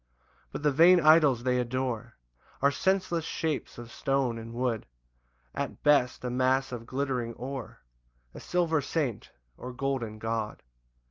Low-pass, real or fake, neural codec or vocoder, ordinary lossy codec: 7.2 kHz; real; none; Opus, 24 kbps